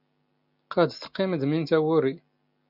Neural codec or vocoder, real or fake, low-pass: none; real; 5.4 kHz